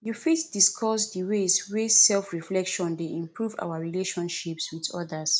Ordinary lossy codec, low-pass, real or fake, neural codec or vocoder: none; none; real; none